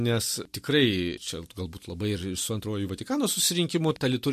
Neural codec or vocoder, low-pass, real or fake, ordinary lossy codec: none; 14.4 kHz; real; MP3, 64 kbps